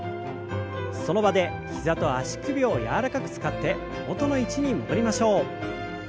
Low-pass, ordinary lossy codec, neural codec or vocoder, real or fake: none; none; none; real